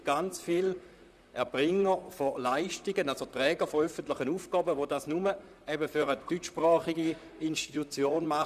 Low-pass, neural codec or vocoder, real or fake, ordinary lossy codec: 14.4 kHz; vocoder, 44.1 kHz, 128 mel bands, Pupu-Vocoder; fake; none